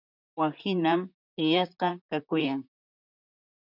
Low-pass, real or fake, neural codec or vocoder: 5.4 kHz; fake; vocoder, 44.1 kHz, 128 mel bands, Pupu-Vocoder